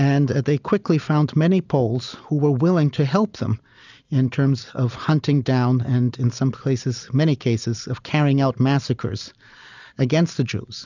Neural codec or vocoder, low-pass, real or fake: none; 7.2 kHz; real